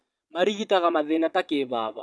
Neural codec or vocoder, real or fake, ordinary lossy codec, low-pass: vocoder, 22.05 kHz, 80 mel bands, Vocos; fake; none; none